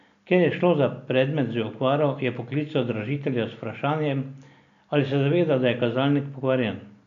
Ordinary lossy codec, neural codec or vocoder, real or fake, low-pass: none; none; real; 7.2 kHz